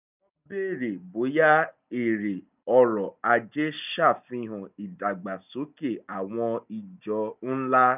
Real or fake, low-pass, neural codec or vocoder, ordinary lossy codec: real; 3.6 kHz; none; none